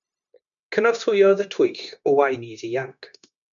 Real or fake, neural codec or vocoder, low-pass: fake; codec, 16 kHz, 0.9 kbps, LongCat-Audio-Codec; 7.2 kHz